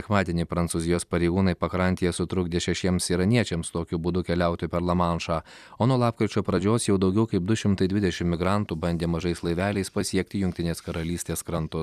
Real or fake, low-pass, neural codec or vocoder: fake; 14.4 kHz; vocoder, 44.1 kHz, 128 mel bands every 256 samples, BigVGAN v2